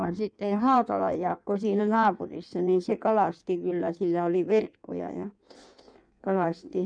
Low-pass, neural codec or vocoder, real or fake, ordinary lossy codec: 9.9 kHz; codec, 16 kHz in and 24 kHz out, 1.1 kbps, FireRedTTS-2 codec; fake; none